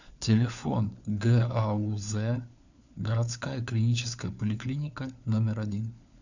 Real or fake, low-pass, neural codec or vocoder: fake; 7.2 kHz; codec, 16 kHz, 4 kbps, FunCodec, trained on LibriTTS, 50 frames a second